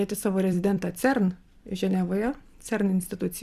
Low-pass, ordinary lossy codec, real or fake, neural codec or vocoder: 14.4 kHz; Opus, 64 kbps; fake; vocoder, 44.1 kHz, 128 mel bands every 256 samples, BigVGAN v2